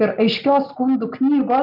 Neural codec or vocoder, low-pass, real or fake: none; 5.4 kHz; real